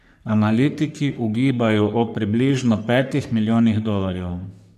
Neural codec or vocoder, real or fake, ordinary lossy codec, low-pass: codec, 44.1 kHz, 3.4 kbps, Pupu-Codec; fake; none; 14.4 kHz